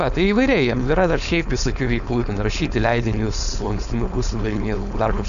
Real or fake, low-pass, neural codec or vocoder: fake; 7.2 kHz; codec, 16 kHz, 4.8 kbps, FACodec